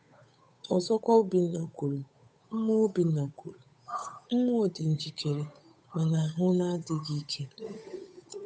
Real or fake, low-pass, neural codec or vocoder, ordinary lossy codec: fake; none; codec, 16 kHz, 8 kbps, FunCodec, trained on Chinese and English, 25 frames a second; none